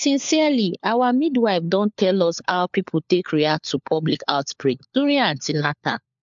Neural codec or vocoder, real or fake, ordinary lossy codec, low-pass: codec, 16 kHz, 4 kbps, FunCodec, trained on LibriTTS, 50 frames a second; fake; MP3, 64 kbps; 7.2 kHz